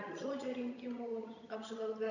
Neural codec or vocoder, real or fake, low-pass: vocoder, 22.05 kHz, 80 mel bands, HiFi-GAN; fake; 7.2 kHz